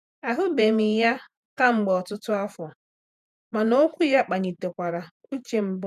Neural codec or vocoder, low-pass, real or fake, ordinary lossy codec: vocoder, 48 kHz, 128 mel bands, Vocos; 14.4 kHz; fake; none